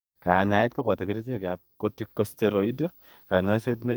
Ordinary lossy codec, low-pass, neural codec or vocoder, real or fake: none; none; codec, 44.1 kHz, 2.6 kbps, SNAC; fake